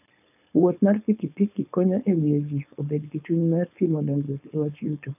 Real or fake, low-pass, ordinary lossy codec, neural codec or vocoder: fake; 3.6 kHz; none; codec, 16 kHz, 4.8 kbps, FACodec